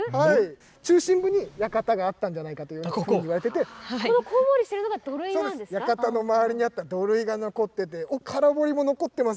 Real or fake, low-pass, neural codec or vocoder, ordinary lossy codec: real; none; none; none